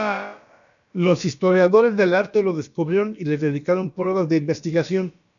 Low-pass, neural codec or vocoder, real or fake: 7.2 kHz; codec, 16 kHz, about 1 kbps, DyCAST, with the encoder's durations; fake